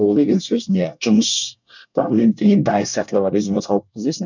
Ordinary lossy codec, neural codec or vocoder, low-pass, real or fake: none; codec, 24 kHz, 1 kbps, SNAC; 7.2 kHz; fake